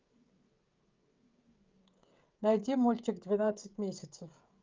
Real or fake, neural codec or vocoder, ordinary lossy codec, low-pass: fake; codec, 16 kHz, 16 kbps, FreqCodec, smaller model; Opus, 24 kbps; 7.2 kHz